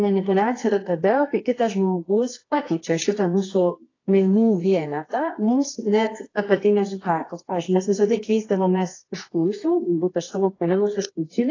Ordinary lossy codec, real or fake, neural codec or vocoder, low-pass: AAC, 32 kbps; fake; codec, 24 kHz, 0.9 kbps, WavTokenizer, medium music audio release; 7.2 kHz